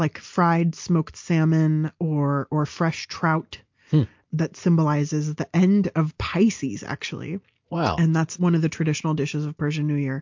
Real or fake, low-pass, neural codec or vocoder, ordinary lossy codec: real; 7.2 kHz; none; MP3, 48 kbps